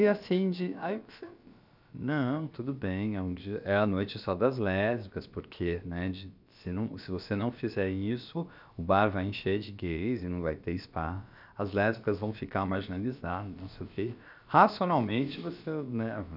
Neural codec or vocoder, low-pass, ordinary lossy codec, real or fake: codec, 16 kHz, about 1 kbps, DyCAST, with the encoder's durations; 5.4 kHz; none; fake